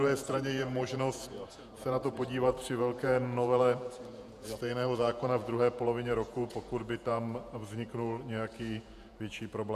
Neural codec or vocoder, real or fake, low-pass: vocoder, 48 kHz, 128 mel bands, Vocos; fake; 14.4 kHz